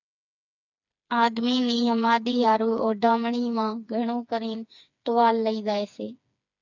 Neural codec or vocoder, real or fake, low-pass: codec, 16 kHz, 4 kbps, FreqCodec, smaller model; fake; 7.2 kHz